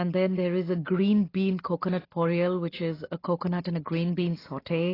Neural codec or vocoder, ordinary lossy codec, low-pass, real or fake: none; AAC, 24 kbps; 5.4 kHz; real